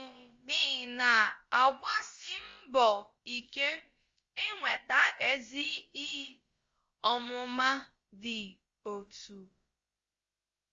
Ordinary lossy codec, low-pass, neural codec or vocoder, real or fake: Opus, 32 kbps; 7.2 kHz; codec, 16 kHz, about 1 kbps, DyCAST, with the encoder's durations; fake